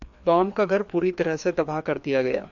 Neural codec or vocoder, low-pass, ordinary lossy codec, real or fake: codec, 16 kHz, 2 kbps, FreqCodec, larger model; 7.2 kHz; AAC, 64 kbps; fake